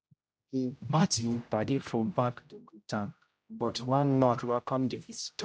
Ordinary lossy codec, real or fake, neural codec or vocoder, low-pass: none; fake; codec, 16 kHz, 0.5 kbps, X-Codec, HuBERT features, trained on general audio; none